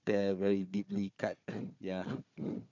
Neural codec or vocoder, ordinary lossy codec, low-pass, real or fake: codec, 16 kHz, 4 kbps, FunCodec, trained on Chinese and English, 50 frames a second; MP3, 64 kbps; 7.2 kHz; fake